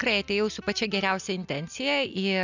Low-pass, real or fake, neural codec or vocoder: 7.2 kHz; real; none